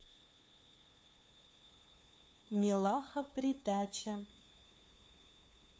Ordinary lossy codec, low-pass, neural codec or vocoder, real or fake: none; none; codec, 16 kHz, 2 kbps, FunCodec, trained on LibriTTS, 25 frames a second; fake